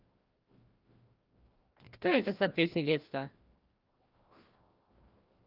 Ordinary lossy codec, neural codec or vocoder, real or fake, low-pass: Opus, 24 kbps; codec, 16 kHz, 1 kbps, FreqCodec, larger model; fake; 5.4 kHz